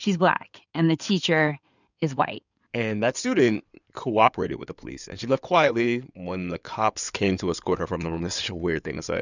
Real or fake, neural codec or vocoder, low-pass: fake; codec, 16 kHz in and 24 kHz out, 2.2 kbps, FireRedTTS-2 codec; 7.2 kHz